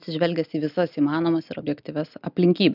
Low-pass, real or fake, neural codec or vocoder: 5.4 kHz; real; none